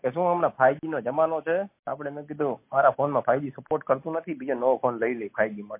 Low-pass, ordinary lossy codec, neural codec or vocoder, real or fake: 3.6 kHz; MP3, 24 kbps; none; real